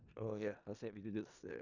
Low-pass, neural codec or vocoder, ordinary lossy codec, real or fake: 7.2 kHz; codec, 16 kHz in and 24 kHz out, 0.4 kbps, LongCat-Audio-Codec, four codebook decoder; none; fake